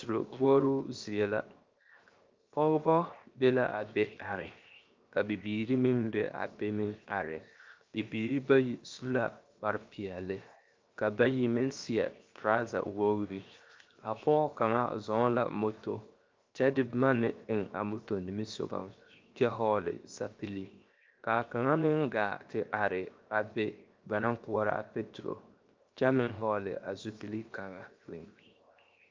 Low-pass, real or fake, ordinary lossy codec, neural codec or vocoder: 7.2 kHz; fake; Opus, 24 kbps; codec, 16 kHz, 0.7 kbps, FocalCodec